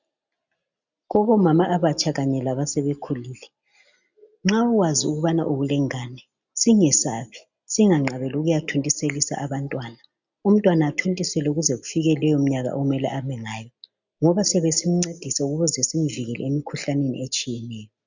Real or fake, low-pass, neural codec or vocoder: real; 7.2 kHz; none